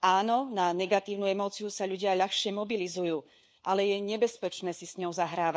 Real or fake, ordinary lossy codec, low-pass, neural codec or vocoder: fake; none; none; codec, 16 kHz, 4 kbps, FunCodec, trained on LibriTTS, 50 frames a second